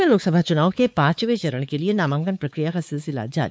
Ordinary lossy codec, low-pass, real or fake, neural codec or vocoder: none; none; fake; codec, 16 kHz, 4 kbps, X-Codec, WavLM features, trained on Multilingual LibriSpeech